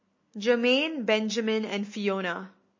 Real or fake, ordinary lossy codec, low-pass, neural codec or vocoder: real; MP3, 32 kbps; 7.2 kHz; none